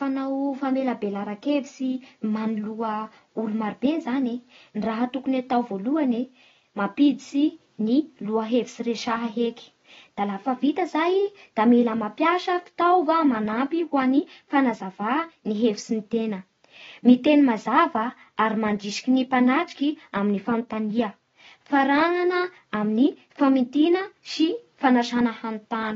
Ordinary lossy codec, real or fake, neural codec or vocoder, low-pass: AAC, 24 kbps; real; none; 7.2 kHz